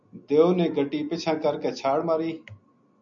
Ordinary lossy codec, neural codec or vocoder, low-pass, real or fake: MP3, 64 kbps; none; 7.2 kHz; real